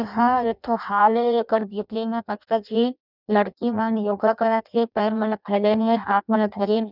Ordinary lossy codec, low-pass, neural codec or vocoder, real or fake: none; 5.4 kHz; codec, 16 kHz in and 24 kHz out, 0.6 kbps, FireRedTTS-2 codec; fake